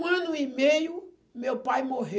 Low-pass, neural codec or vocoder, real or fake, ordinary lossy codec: none; none; real; none